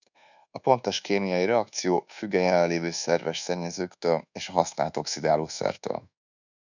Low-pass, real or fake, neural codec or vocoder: 7.2 kHz; fake; codec, 24 kHz, 1.2 kbps, DualCodec